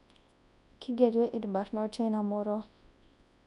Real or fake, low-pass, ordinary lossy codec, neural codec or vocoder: fake; 10.8 kHz; none; codec, 24 kHz, 0.9 kbps, WavTokenizer, large speech release